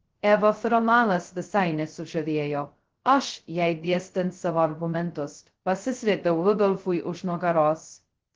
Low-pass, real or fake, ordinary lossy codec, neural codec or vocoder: 7.2 kHz; fake; Opus, 16 kbps; codec, 16 kHz, 0.2 kbps, FocalCodec